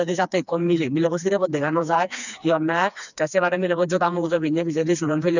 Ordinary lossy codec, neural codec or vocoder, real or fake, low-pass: none; codec, 16 kHz, 2 kbps, FreqCodec, smaller model; fake; 7.2 kHz